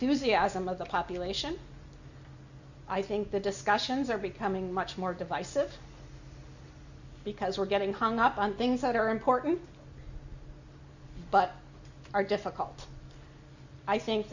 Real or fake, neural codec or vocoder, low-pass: real; none; 7.2 kHz